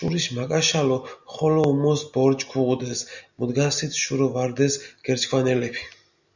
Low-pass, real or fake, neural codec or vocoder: 7.2 kHz; real; none